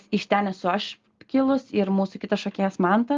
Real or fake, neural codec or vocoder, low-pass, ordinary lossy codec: real; none; 7.2 kHz; Opus, 32 kbps